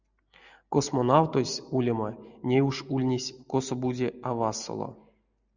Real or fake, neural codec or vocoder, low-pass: real; none; 7.2 kHz